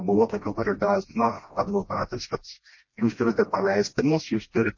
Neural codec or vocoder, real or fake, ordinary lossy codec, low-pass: codec, 16 kHz, 1 kbps, FreqCodec, smaller model; fake; MP3, 32 kbps; 7.2 kHz